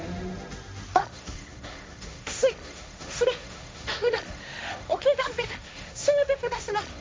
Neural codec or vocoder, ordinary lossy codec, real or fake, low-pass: codec, 16 kHz, 1.1 kbps, Voila-Tokenizer; none; fake; none